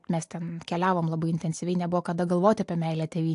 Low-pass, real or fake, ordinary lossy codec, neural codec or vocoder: 10.8 kHz; real; AAC, 64 kbps; none